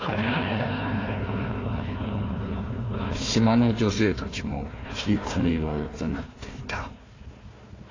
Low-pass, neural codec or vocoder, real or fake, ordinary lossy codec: 7.2 kHz; codec, 16 kHz, 1 kbps, FunCodec, trained on Chinese and English, 50 frames a second; fake; AAC, 32 kbps